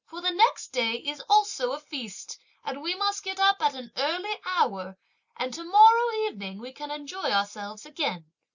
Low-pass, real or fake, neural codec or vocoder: 7.2 kHz; real; none